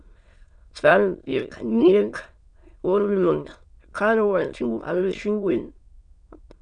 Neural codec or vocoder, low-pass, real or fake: autoencoder, 22.05 kHz, a latent of 192 numbers a frame, VITS, trained on many speakers; 9.9 kHz; fake